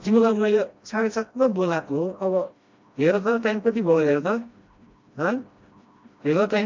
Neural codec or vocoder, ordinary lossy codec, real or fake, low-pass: codec, 16 kHz, 1 kbps, FreqCodec, smaller model; MP3, 48 kbps; fake; 7.2 kHz